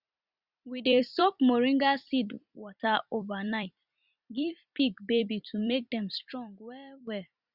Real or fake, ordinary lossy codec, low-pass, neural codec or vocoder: fake; Opus, 64 kbps; 5.4 kHz; vocoder, 44.1 kHz, 128 mel bands every 256 samples, BigVGAN v2